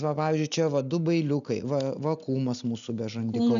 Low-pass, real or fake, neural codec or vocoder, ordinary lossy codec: 7.2 kHz; real; none; MP3, 96 kbps